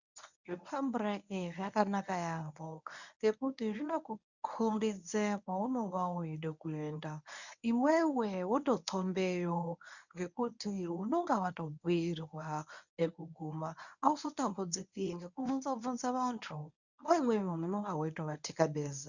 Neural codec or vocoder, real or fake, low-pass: codec, 24 kHz, 0.9 kbps, WavTokenizer, medium speech release version 1; fake; 7.2 kHz